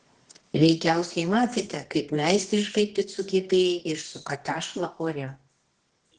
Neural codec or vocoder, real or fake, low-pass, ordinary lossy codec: codec, 24 kHz, 0.9 kbps, WavTokenizer, medium music audio release; fake; 10.8 kHz; Opus, 16 kbps